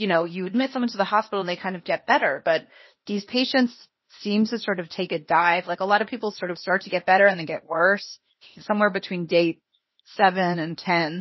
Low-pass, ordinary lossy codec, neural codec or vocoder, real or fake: 7.2 kHz; MP3, 24 kbps; codec, 16 kHz, 0.8 kbps, ZipCodec; fake